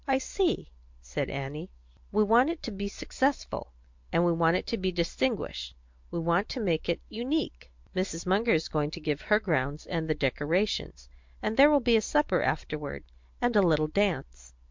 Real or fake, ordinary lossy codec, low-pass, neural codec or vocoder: real; MP3, 64 kbps; 7.2 kHz; none